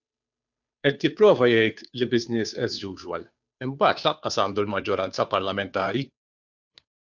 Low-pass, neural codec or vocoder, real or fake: 7.2 kHz; codec, 16 kHz, 2 kbps, FunCodec, trained on Chinese and English, 25 frames a second; fake